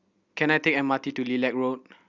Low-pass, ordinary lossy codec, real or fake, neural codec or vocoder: 7.2 kHz; Opus, 64 kbps; real; none